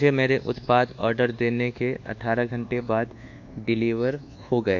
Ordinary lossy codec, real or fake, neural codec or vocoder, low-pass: AAC, 48 kbps; fake; codec, 24 kHz, 1.2 kbps, DualCodec; 7.2 kHz